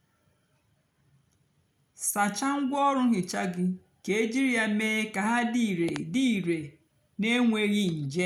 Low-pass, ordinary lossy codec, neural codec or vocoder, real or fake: 19.8 kHz; none; none; real